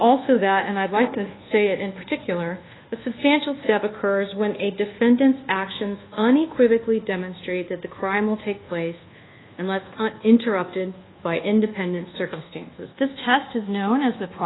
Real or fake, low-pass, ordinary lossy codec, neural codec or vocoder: fake; 7.2 kHz; AAC, 16 kbps; codec, 24 kHz, 1.2 kbps, DualCodec